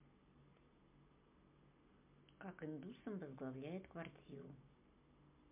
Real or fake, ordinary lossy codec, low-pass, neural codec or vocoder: real; MP3, 32 kbps; 3.6 kHz; none